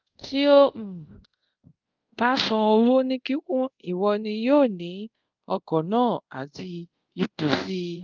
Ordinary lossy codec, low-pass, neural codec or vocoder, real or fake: Opus, 32 kbps; 7.2 kHz; codec, 24 kHz, 0.5 kbps, DualCodec; fake